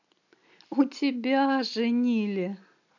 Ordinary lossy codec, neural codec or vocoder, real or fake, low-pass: none; none; real; 7.2 kHz